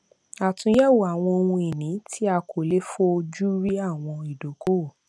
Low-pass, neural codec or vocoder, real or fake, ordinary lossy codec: none; none; real; none